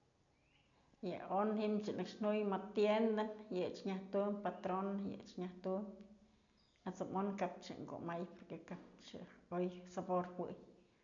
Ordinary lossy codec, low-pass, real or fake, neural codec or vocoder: none; 7.2 kHz; real; none